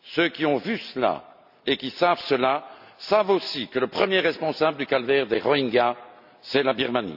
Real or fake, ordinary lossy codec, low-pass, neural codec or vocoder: real; none; 5.4 kHz; none